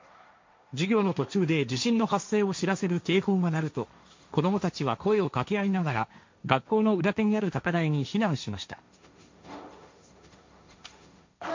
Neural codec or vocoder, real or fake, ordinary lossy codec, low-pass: codec, 16 kHz, 1.1 kbps, Voila-Tokenizer; fake; MP3, 48 kbps; 7.2 kHz